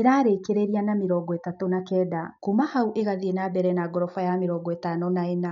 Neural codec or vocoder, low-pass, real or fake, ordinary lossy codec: none; 7.2 kHz; real; none